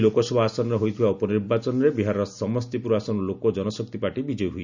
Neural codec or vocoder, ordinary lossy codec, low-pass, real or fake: none; none; 7.2 kHz; real